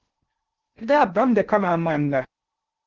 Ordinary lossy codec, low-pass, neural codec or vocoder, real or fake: Opus, 16 kbps; 7.2 kHz; codec, 16 kHz in and 24 kHz out, 0.6 kbps, FocalCodec, streaming, 2048 codes; fake